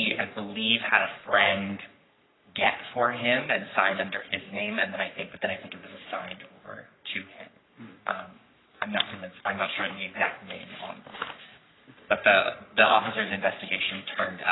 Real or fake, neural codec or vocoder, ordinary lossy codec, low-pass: fake; codec, 44.1 kHz, 3.4 kbps, Pupu-Codec; AAC, 16 kbps; 7.2 kHz